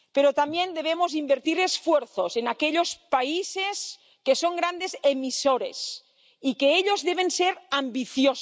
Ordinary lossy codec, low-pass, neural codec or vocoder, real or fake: none; none; none; real